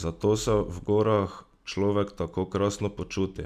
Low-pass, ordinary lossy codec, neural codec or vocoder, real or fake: 14.4 kHz; none; none; real